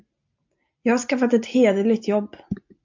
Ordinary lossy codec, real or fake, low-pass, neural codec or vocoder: MP3, 64 kbps; real; 7.2 kHz; none